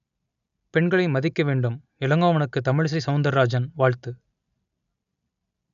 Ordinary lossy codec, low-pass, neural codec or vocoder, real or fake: none; 7.2 kHz; none; real